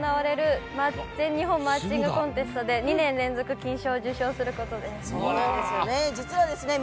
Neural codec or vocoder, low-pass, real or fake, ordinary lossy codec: none; none; real; none